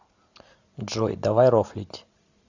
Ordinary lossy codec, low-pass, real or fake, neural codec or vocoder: Opus, 64 kbps; 7.2 kHz; real; none